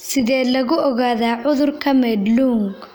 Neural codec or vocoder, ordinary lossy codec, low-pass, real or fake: none; none; none; real